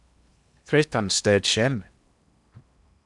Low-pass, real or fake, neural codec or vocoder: 10.8 kHz; fake; codec, 16 kHz in and 24 kHz out, 0.8 kbps, FocalCodec, streaming, 65536 codes